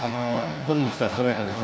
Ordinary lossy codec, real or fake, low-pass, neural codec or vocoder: none; fake; none; codec, 16 kHz, 1 kbps, FunCodec, trained on LibriTTS, 50 frames a second